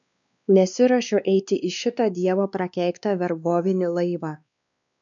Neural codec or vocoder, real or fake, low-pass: codec, 16 kHz, 2 kbps, X-Codec, WavLM features, trained on Multilingual LibriSpeech; fake; 7.2 kHz